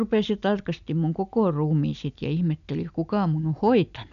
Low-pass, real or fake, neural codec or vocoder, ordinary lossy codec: 7.2 kHz; real; none; none